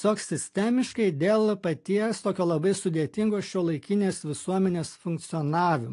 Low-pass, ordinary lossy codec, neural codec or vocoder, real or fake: 10.8 kHz; AAC, 48 kbps; none; real